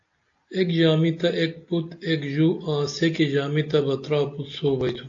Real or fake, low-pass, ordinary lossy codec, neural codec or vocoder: real; 7.2 kHz; AAC, 48 kbps; none